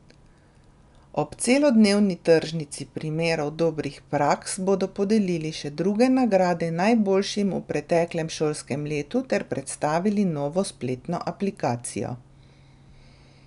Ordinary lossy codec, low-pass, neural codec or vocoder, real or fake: none; 10.8 kHz; none; real